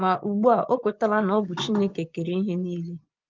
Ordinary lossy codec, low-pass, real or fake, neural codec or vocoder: Opus, 24 kbps; 7.2 kHz; fake; vocoder, 24 kHz, 100 mel bands, Vocos